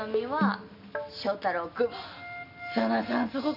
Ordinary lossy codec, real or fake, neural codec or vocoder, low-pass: none; real; none; 5.4 kHz